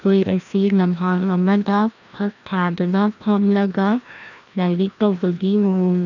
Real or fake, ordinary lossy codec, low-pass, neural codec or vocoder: fake; none; 7.2 kHz; codec, 16 kHz, 1 kbps, FreqCodec, larger model